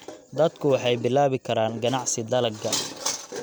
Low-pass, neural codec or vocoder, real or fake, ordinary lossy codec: none; none; real; none